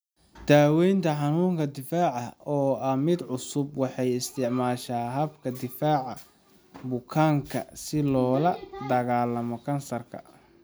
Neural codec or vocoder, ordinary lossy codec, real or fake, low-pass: none; none; real; none